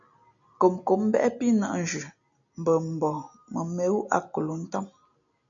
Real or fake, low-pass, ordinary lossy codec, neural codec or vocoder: real; 7.2 kHz; MP3, 96 kbps; none